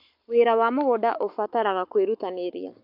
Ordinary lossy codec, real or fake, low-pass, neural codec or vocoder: MP3, 48 kbps; fake; 5.4 kHz; codec, 44.1 kHz, 7.8 kbps, Pupu-Codec